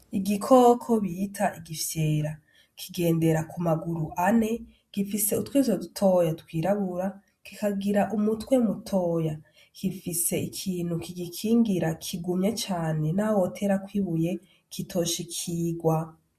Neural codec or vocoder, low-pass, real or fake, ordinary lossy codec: none; 14.4 kHz; real; MP3, 64 kbps